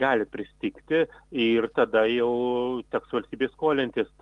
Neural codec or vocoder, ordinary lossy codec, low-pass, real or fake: none; Opus, 24 kbps; 10.8 kHz; real